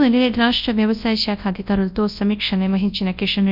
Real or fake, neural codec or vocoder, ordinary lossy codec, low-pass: fake; codec, 24 kHz, 0.9 kbps, WavTokenizer, large speech release; none; 5.4 kHz